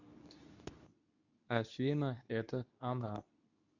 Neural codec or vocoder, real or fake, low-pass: codec, 24 kHz, 0.9 kbps, WavTokenizer, medium speech release version 2; fake; 7.2 kHz